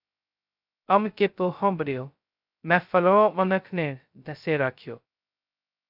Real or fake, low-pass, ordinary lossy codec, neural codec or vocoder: fake; 5.4 kHz; AAC, 48 kbps; codec, 16 kHz, 0.2 kbps, FocalCodec